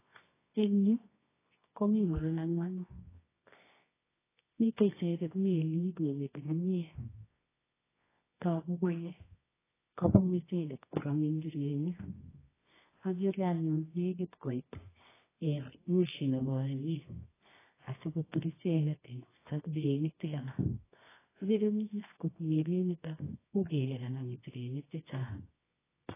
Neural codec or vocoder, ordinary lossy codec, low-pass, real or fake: codec, 24 kHz, 0.9 kbps, WavTokenizer, medium music audio release; AAC, 16 kbps; 3.6 kHz; fake